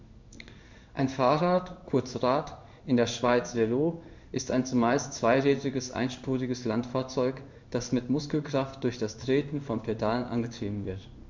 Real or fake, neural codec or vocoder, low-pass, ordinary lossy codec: fake; codec, 16 kHz in and 24 kHz out, 1 kbps, XY-Tokenizer; 7.2 kHz; MP3, 64 kbps